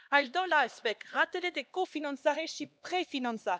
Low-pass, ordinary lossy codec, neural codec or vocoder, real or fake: none; none; codec, 16 kHz, 2 kbps, X-Codec, HuBERT features, trained on LibriSpeech; fake